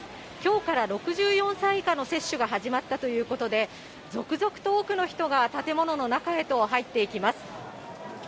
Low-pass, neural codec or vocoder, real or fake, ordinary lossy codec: none; none; real; none